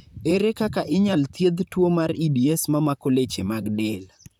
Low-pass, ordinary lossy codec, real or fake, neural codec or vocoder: 19.8 kHz; none; fake; vocoder, 44.1 kHz, 128 mel bands, Pupu-Vocoder